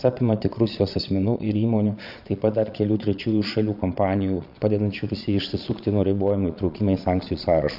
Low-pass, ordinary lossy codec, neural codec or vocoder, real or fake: 5.4 kHz; AAC, 48 kbps; vocoder, 22.05 kHz, 80 mel bands, Vocos; fake